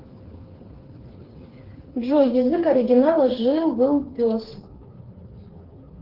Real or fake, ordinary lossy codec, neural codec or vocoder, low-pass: fake; Opus, 16 kbps; codec, 16 kHz, 4 kbps, FreqCodec, smaller model; 5.4 kHz